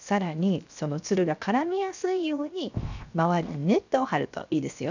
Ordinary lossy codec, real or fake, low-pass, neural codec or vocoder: none; fake; 7.2 kHz; codec, 16 kHz, 0.7 kbps, FocalCodec